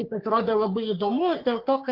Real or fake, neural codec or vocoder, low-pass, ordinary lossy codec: fake; codec, 44.1 kHz, 3.4 kbps, Pupu-Codec; 5.4 kHz; Opus, 32 kbps